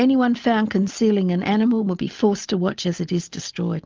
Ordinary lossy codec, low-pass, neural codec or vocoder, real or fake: Opus, 24 kbps; 7.2 kHz; none; real